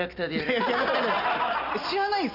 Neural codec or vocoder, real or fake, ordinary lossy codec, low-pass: none; real; none; 5.4 kHz